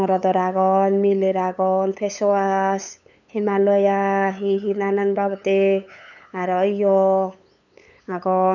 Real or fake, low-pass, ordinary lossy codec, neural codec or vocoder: fake; 7.2 kHz; none; codec, 16 kHz, 8 kbps, FunCodec, trained on LibriTTS, 25 frames a second